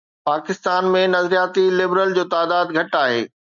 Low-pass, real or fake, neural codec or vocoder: 7.2 kHz; real; none